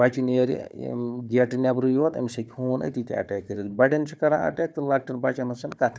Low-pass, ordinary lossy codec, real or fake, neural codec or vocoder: none; none; fake; codec, 16 kHz, 4 kbps, FunCodec, trained on Chinese and English, 50 frames a second